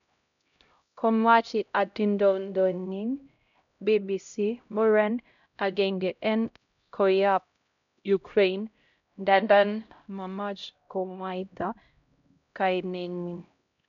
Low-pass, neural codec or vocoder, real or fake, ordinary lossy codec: 7.2 kHz; codec, 16 kHz, 0.5 kbps, X-Codec, HuBERT features, trained on LibriSpeech; fake; none